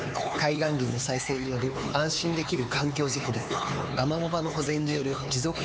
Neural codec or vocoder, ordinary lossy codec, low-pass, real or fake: codec, 16 kHz, 4 kbps, X-Codec, HuBERT features, trained on LibriSpeech; none; none; fake